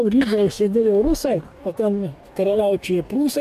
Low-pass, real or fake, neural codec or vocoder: 14.4 kHz; fake; codec, 44.1 kHz, 2.6 kbps, DAC